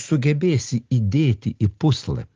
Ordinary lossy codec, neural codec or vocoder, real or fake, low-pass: Opus, 16 kbps; none; real; 7.2 kHz